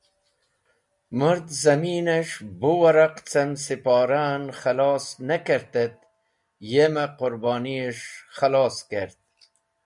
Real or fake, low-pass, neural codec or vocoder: real; 10.8 kHz; none